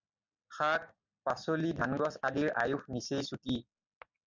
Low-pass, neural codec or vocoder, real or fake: 7.2 kHz; none; real